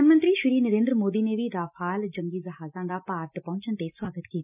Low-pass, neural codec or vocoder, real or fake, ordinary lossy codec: 3.6 kHz; none; real; none